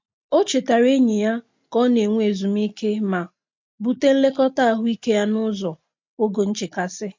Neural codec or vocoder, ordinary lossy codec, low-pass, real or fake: none; MP3, 48 kbps; 7.2 kHz; real